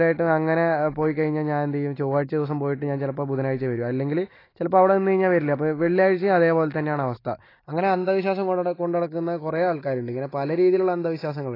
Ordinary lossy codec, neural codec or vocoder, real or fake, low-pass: AAC, 24 kbps; none; real; 5.4 kHz